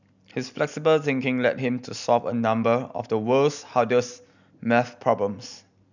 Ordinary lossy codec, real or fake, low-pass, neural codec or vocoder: none; real; 7.2 kHz; none